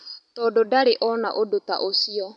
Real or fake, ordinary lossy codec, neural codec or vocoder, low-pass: fake; none; vocoder, 44.1 kHz, 128 mel bands every 256 samples, BigVGAN v2; 10.8 kHz